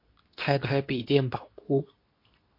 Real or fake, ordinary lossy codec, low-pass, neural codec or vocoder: fake; MP3, 32 kbps; 5.4 kHz; codec, 24 kHz, 0.9 kbps, WavTokenizer, medium speech release version 2